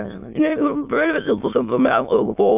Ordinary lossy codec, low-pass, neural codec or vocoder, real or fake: AAC, 32 kbps; 3.6 kHz; autoencoder, 22.05 kHz, a latent of 192 numbers a frame, VITS, trained on many speakers; fake